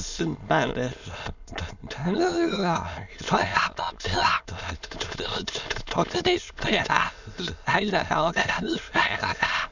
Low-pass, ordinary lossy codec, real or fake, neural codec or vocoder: 7.2 kHz; none; fake; autoencoder, 22.05 kHz, a latent of 192 numbers a frame, VITS, trained on many speakers